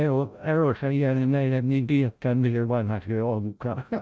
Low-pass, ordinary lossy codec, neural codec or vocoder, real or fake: none; none; codec, 16 kHz, 0.5 kbps, FreqCodec, larger model; fake